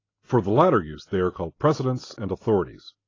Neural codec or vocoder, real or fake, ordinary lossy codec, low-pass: none; real; AAC, 32 kbps; 7.2 kHz